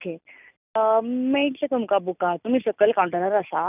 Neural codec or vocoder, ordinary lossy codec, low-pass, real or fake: none; none; 3.6 kHz; real